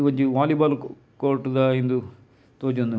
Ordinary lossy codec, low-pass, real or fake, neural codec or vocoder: none; none; real; none